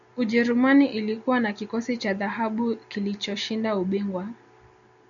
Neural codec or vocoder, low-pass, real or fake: none; 7.2 kHz; real